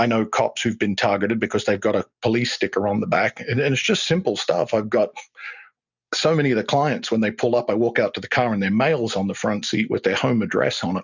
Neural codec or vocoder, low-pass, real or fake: none; 7.2 kHz; real